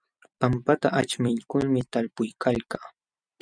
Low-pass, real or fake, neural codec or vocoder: 9.9 kHz; fake; vocoder, 44.1 kHz, 128 mel bands every 256 samples, BigVGAN v2